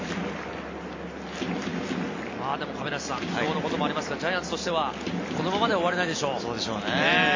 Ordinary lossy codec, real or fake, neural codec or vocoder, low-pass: MP3, 32 kbps; real; none; 7.2 kHz